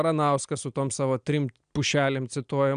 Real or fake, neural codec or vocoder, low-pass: real; none; 9.9 kHz